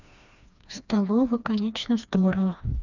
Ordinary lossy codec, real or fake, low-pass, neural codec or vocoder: none; fake; 7.2 kHz; codec, 16 kHz, 2 kbps, FreqCodec, smaller model